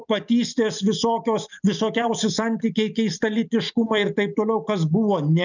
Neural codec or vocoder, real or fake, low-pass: none; real; 7.2 kHz